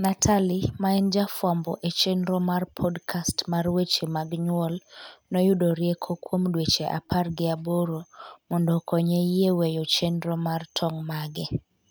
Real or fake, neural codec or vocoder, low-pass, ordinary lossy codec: real; none; none; none